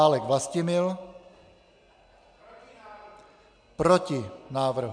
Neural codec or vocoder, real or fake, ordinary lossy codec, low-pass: none; real; MP3, 64 kbps; 9.9 kHz